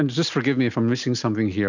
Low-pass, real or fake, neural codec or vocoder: 7.2 kHz; real; none